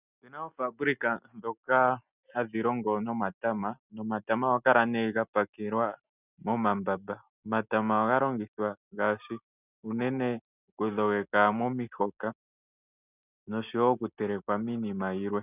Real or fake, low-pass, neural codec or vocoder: real; 3.6 kHz; none